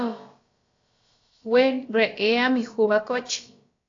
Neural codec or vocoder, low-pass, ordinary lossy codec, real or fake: codec, 16 kHz, about 1 kbps, DyCAST, with the encoder's durations; 7.2 kHz; AAC, 48 kbps; fake